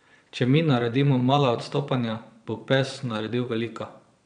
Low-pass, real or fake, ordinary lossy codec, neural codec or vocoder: 9.9 kHz; fake; none; vocoder, 22.05 kHz, 80 mel bands, Vocos